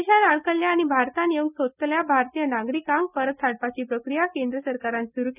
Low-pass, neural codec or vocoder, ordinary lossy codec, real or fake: 3.6 kHz; none; none; real